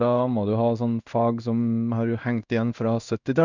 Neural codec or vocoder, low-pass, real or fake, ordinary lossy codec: codec, 16 kHz in and 24 kHz out, 1 kbps, XY-Tokenizer; 7.2 kHz; fake; none